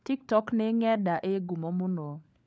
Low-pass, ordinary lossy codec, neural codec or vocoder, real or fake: none; none; codec, 16 kHz, 16 kbps, FunCodec, trained on LibriTTS, 50 frames a second; fake